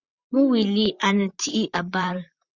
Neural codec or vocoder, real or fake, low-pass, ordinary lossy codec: vocoder, 44.1 kHz, 128 mel bands, Pupu-Vocoder; fake; 7.2 kHz; Opus, 64 kbps